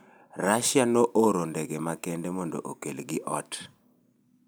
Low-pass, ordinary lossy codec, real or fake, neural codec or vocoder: none; none; real; none